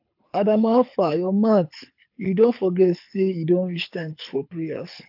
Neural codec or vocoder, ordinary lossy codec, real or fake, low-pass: codec, 24 kHz, 6 kbps, HILCodec; AAC, 48 kbps; fake; 5.4 kHz